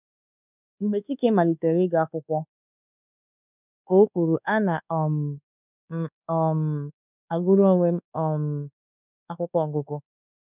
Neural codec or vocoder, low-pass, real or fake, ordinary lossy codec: codec, 24 kHz, 1.2 kbps, DualCodec; 3.6 kHz; fake; none